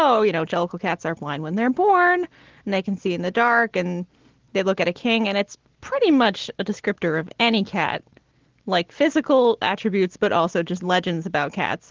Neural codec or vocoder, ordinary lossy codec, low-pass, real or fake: none; Opus, 16 kbps; 7.2 kHz; real